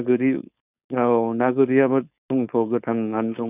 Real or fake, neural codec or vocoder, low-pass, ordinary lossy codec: fake; codec, 16 kHz, 4.8 kbps, FACodec; 3.6 kHz; none